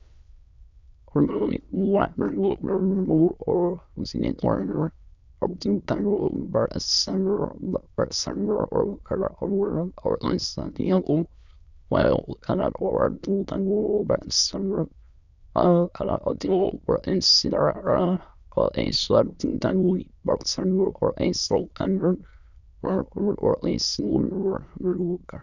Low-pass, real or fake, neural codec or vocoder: 7.2 kHz; fake; autoencoder, 22.05 kHz, a latent of 192 numbers a frame, VITS, trained on many speakers